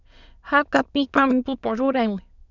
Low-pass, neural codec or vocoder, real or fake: 7.2 kHz; autoencoder, 22.05 kHz, a latent of 192 numbers a frame, VITS, trained on many speakers; fake